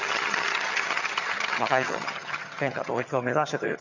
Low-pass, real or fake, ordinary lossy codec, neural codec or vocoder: 7.2 kHz; fake; none; vocoder, 22.05 kHz, 80 mel bands, HiFi-GAN